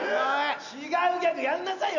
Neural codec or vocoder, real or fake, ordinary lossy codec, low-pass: none; real; none; 7.2 kHz